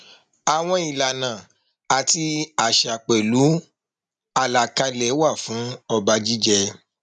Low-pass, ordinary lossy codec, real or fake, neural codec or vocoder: 9.9 kHz; none; real; none